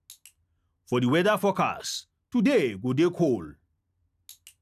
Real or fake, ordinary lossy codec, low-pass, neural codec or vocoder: real; none; 14.4 kHz; none